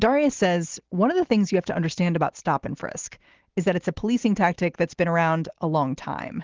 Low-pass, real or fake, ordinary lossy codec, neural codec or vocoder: 7.2 kHz; real; Opus, 16 kbps; none